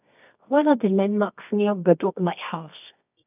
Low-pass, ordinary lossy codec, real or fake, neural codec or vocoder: 3.6 kHz; none; fake; codec, 24 kHz, 0.9 kbps, WavTokenizer, medium music audio release